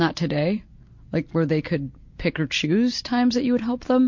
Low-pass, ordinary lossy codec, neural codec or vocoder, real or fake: 7.2 kHz; MP3, 48 kbps; none; real